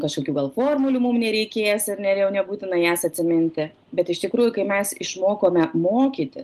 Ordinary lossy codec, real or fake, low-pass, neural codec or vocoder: Opus, 24 kbps; real; 14.4 kHz; none